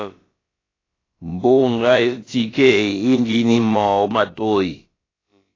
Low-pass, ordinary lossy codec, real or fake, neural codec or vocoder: 7.2 kHz; AAC, 32 kbps; fake; codec, 16 kHz, about 1 kbps, DyCAST, with the encoder's durations